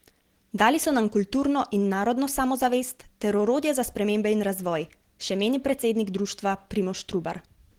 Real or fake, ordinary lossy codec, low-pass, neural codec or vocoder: real; Opus, 16 kbps; 19.8 kHz; none